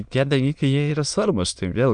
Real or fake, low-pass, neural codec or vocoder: fake; 9.9 kHz; autoencoder, 22.05 kHz, a latent of 192 numbers a frame, VITS, trained on many speakers